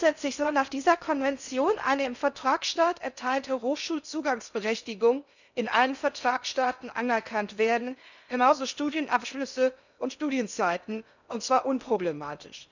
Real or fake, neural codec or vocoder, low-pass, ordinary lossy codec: fake; codec, 16 kHz in and 24 kHz out, 0.6 kbps, FocalCodec, streaming, 4096 codes; 7.2 kHz; none